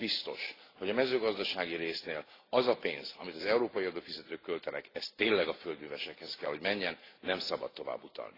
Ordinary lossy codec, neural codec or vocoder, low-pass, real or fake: AAC, 24 kbps; none; 5.4 kHz; real